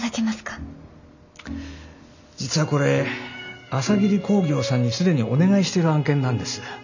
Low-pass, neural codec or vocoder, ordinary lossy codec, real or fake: 7.2 kHz; none; none; real